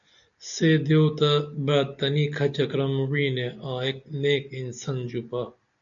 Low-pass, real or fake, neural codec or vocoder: 7.2 kHz; real; none